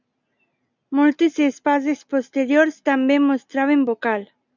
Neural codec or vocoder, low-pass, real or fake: none; 7.2 kHz; real